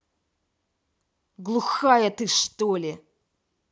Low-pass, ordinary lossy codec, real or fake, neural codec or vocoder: none; none; real; none